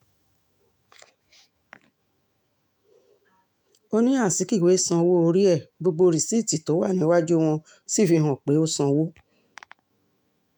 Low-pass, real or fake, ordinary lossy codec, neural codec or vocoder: 19.8 kHz; fake; MP3, 96 kbps; autoencoder, 48 kHz, 128 numbers a frame, DAC-VAE, trained on Japanese speech